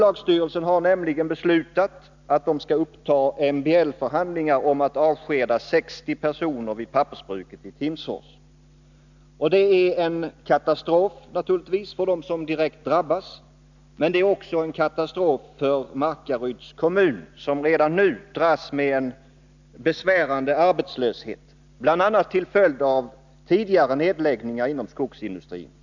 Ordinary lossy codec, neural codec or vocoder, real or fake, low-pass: none; none; real; 7.2 kHz